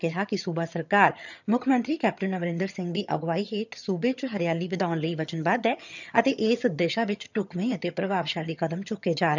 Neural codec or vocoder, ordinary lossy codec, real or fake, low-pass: vocoder, 22.05 kHz, 80 mel bands, HiFi-GAN; none; fake; 7.2 kHz